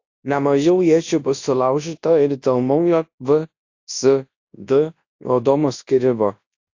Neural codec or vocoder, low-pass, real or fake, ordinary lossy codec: codec, 24 kHz, 0.9 kbps, WavTokenizer, large speech release; 7.2 kHz; fake; AAC, 48 kbps